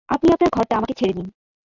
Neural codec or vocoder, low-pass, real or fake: none; 7.2 kHz; real